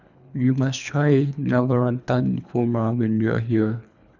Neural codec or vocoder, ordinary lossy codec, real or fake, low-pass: codec, 24 kHz, 3 kbps, HILCodec; none; fake; 7.2 kHz